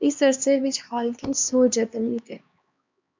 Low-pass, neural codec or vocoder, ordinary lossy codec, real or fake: 7.2 kHz; codec, 16 kHz, 2 kbps, X-Codec, HuBERT features, trained on LibriSpeech; MP3, 64 kbps; fake